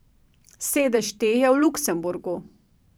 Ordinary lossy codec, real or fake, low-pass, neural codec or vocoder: none; fake; none; vocoder, 44.1 kHz, 128 mel bands every 512 samples, BigVGAN v2